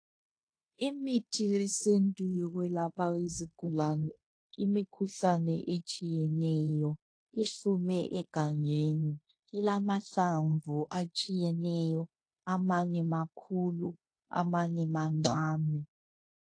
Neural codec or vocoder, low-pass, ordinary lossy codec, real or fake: codec, 16 kHz in and 24 kHz out, 0.9 kbps, LongCat-Audio-Codec, fine tuned four codebook decoder; 9.9 kHz; AAC, 48 kbps; fake